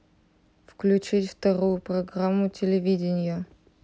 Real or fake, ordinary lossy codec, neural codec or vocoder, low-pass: real; none; none; none